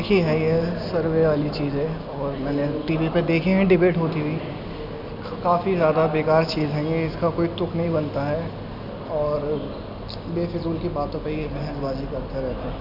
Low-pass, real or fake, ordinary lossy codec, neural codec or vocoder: 5.4 kHz; real; none; none